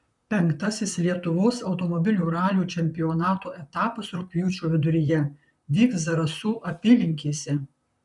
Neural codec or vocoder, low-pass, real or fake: vocoder, 44.1 kHz, 128 mel bands, Pupu-Vocoder; 10.8 kHz; fake